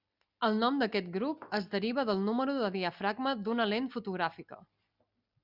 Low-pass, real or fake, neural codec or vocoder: 5.4 kHz; real; none